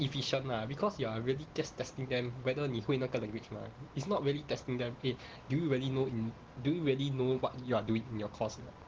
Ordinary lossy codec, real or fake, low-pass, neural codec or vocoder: Opus, 16 kbps; real; 7.2 kHz; none